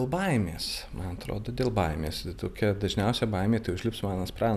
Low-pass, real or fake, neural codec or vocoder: 14.4 kHz; real; none